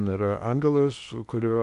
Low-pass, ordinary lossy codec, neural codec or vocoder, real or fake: 10.8 kHz; AAC, 96 kbps; codec, 16 kHz in and 24 kHz out, 0.8 kbps, FocalCodec, streaming, 65536 codes; fake